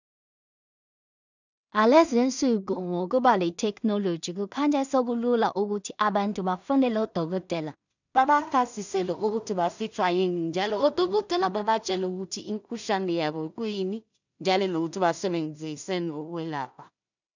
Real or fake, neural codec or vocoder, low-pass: fake; codec, 16 kHz in and 24 kHz out, 0.4 kbps, LongCat-Audio-Codec, two codebook decoder; 7.2 kHz